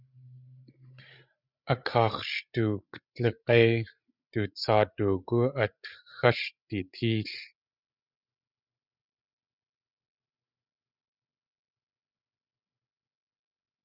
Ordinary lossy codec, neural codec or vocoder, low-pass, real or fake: Opus, 64 kbps; codec, 16 kHz, 16 kbps, FreqCodec, larger model; 5.4 kHz; fake